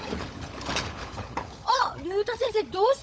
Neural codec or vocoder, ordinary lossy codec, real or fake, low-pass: codec, 16 kHz, 16 kbps, FunCodec, trained on LibriTTS, 50 frames a second; none; fake; none